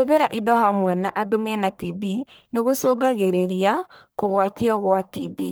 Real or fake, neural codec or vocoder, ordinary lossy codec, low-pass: fake; codec, 44.1 kHz, 1.7 kbps, Pupu-Codec; none; none